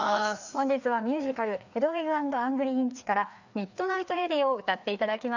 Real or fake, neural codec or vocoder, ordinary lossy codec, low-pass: fake; codec, 16 kHz, 2 kbps, FreqCodec, larger model; none; 7.2 kHz